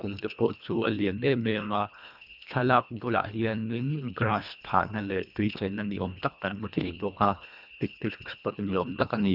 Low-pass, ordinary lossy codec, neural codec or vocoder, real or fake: 5.4 kHz; none; codec, 24 kHz, 1.5 kbps, HILCodec; fake